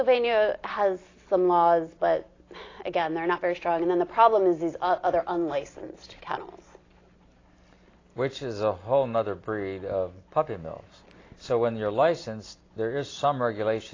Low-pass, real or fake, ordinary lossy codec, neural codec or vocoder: 7.2 kHz; real; AAC, 32 kbps; none